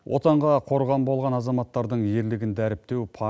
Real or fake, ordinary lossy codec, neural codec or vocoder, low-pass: real; none; none; none